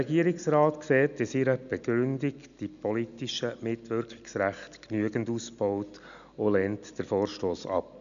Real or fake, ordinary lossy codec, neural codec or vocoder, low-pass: real; MP3, 96 kbps; none; 7.2 kHz